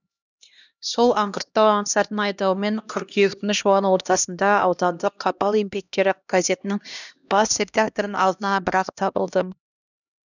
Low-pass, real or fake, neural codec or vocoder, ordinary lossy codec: 7.2 kHz; fake; codec, 16 kHz, 1 kbps, X-Codec, HuBERT features, trained on LibriSpeech; none